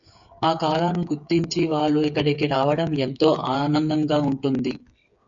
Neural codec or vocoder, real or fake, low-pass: codec, 16 kHz, 8 kbps, FreqCodec, smaller model; fake; 7.2 kHz